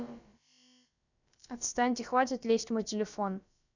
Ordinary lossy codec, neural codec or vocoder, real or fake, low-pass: none; codec, 16 kHz, about 1 kbps, DyCAST, with the encoder's durations; fake; 7.2 kHz